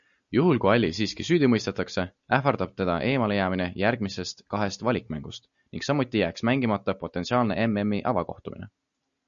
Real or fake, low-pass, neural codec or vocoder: real; 7.2 kHz; none